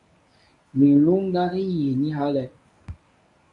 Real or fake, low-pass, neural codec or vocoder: fake; 10.8 kHz; codec, 24 kHz, 0.9 kbps, WavTokenizer, medium speech release version 1